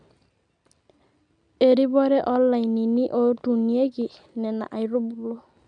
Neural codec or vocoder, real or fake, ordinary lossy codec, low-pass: none; real; none; 9.9 kHz